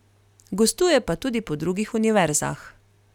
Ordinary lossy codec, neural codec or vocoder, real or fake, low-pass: none; none; real; 19.8 kHz